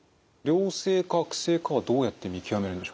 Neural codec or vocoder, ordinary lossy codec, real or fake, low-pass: none; none; real; none